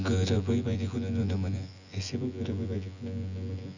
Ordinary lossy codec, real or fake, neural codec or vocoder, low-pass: none; fake; vocoder, 24 kHz, 100 mel bands, Vocos; 7.2 kHz